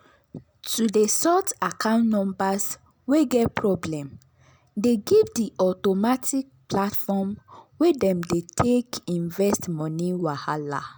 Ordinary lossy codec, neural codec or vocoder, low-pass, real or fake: none; none; none; real